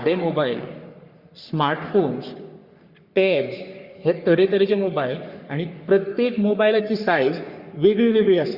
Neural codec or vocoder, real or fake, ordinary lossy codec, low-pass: codec, 44.1 kHz, 3.4 kbps, Pupu-Codec; fake; Opus, 64 kbps; 5.4 kHz